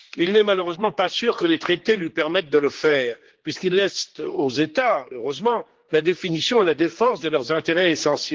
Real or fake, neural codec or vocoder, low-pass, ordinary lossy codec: fake; codec, 16 kHz, 2 kbps, X-Codec, HuBERT features, trained on general audio; 7.2 kHz; Opus, 16 kbps